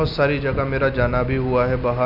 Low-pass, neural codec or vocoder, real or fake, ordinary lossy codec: 5.4 kHz; none; real; none